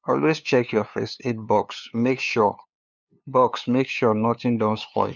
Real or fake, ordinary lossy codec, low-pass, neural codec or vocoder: fake; none; 7.2 kHz; codec, 16 kHz, 2 kbps, FunCodec, trained on LibriTTS, 25 frames a second